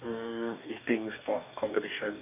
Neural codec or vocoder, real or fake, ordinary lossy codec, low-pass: codec, 44.1 kHz, 2.6 kbps, DAC; fake; none; 3.6 kHz